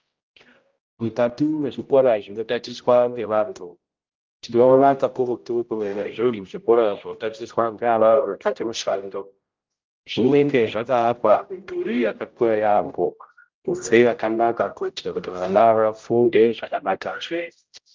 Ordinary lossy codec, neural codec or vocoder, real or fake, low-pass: Opus, 24 kbps; codec, 16 kHz, 0.5 kbps, X-Codec, HuBERT features, trained on general audio; fake; 7.2 kHz